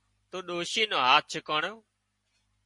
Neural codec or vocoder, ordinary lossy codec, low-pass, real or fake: none; MP3, 48 kbps; 10.8 kHz; real